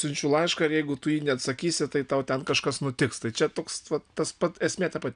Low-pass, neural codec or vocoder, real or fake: 9.9 kHz; none; real